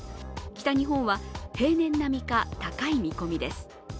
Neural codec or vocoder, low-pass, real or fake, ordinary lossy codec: none; none; real; none